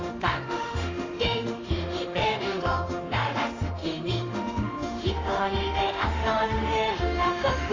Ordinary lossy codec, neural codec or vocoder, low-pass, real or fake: AAC, 32 kbps; autoencoder, 48 kHz, 32 numbers a frame, DAC-VAE, trained on Japanese speech; 7.2 kHz; fake